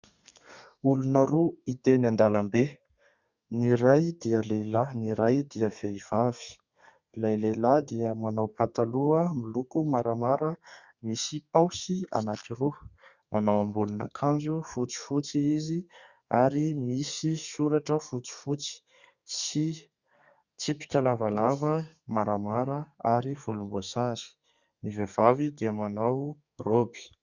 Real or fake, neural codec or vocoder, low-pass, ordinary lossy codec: fake; codec, 32 kHz, 1.9 kbps, SNAC; 7.2 kHz; Opus, 64 kbps